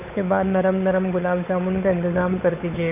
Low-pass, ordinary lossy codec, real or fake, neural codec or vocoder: 3.6 kHz; none; fake; codec, 16 kHz, 8 kbps, FunCodec, trained on Chinese and English, 25 frames a second